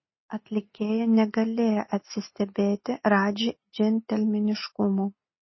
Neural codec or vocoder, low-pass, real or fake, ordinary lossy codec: none; 7.2 kHz; real; MP3, 24 kbps